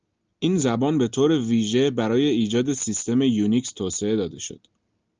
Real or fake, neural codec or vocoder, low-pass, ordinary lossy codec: real; none; 7.2 kHz; Opus, 24 kbps